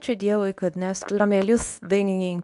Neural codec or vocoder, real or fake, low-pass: codec, 24 kHz, 0.9 kbps, WavTokenizer, medium speech release version 2; fake; 10.8 kHz